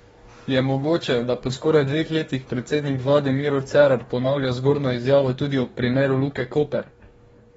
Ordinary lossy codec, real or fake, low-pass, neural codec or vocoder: AAC, 24 kbps; fake; 19.8 kHz; codec, 44.1 kHz, 2.6 kbps, DAC